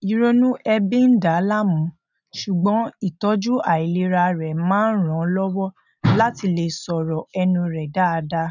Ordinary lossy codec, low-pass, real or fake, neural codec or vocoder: none; 7.2 kHz; real; none